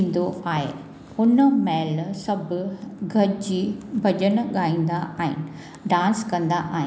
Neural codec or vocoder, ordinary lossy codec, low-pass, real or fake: none; none; none; real